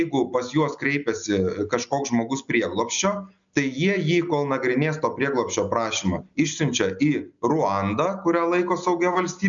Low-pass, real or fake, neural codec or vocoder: 7.2 kHz; real; none